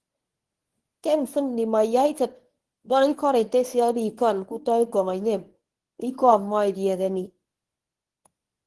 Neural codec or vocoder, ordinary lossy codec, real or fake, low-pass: codec, 24 kHz, 0.9 kbps, WavTokenizer, medium speech release version 1; Opus, 16 kbps; fake; 10.8 kHz